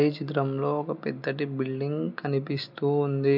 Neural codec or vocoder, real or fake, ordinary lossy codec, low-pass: none; real; none; 5.4 kHz